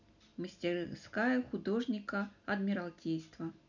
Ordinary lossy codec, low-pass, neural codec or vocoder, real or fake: none; 7.2 kHz; none; real